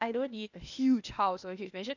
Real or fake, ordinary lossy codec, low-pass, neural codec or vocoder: fake; none; 7.2 kHz; codec, 16 kHz, 0.8 kbps, ZipCodec